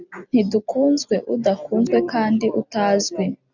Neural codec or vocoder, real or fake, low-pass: none; real; 7.2 kHz